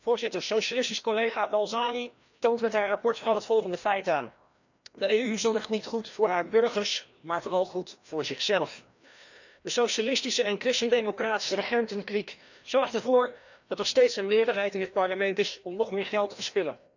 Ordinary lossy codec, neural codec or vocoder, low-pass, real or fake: none; codec, 16 kHz, 1 kbps, FreqCodec, larger model; 7.2 kHz; fake